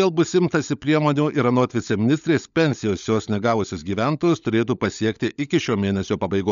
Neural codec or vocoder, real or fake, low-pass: codec, 16 kHz, 16 kbps, FunCodec, trained on LibriTTS, 50 frames a second; fake; 7.2 kHz